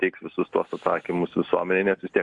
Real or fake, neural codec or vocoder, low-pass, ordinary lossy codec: real; none; 10.8 kHz; AAC, 64 kbps